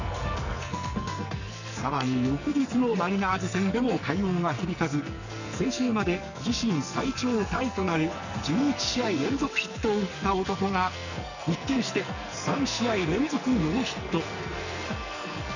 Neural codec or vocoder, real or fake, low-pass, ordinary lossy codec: codec, 44.1 kHz, 2.6 kbps, SNAC; fake; 7.2 kHz; none